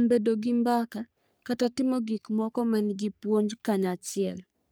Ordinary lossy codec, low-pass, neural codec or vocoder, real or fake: none; none; codec, 44.1 kHz, 3.4 kbps, Pupu-Codec; fake